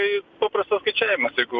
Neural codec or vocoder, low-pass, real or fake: none; 7.2 kHz; real